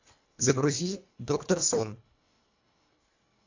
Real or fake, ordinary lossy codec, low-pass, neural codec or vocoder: fake; AAC, 48 kbps; 7.2 kHz; codec, 24 kHz, 1.5 kbps, HILCodec